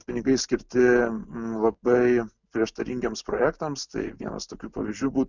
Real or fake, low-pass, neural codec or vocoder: real; 7.2 kHz; none